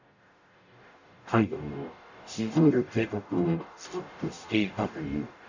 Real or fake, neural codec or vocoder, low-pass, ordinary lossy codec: fake; codec, 44.1 kHz, 0.9 kbps, DAC; 7.2 kHz; none